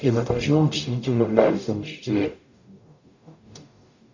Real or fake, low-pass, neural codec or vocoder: fake; 7.2 kHz; codec, 44.1 kHz, 0.9 kbps, DAC